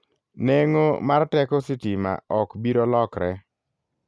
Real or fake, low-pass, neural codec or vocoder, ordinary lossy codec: real; none; none; none